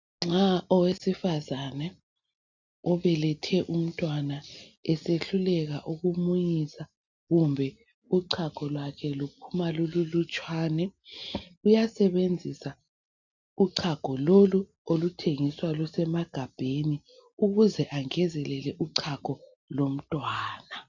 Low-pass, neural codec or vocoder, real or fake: 7.2 kHz; none; real